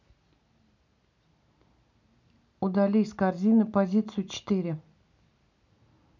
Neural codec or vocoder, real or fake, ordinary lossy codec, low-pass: none; real; none; 7.2 kHz